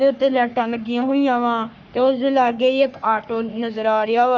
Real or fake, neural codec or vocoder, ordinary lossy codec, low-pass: fake; codec, 44.1 kHz, 3.4 kbps, Pupu-Codec; none; 7.2 kHz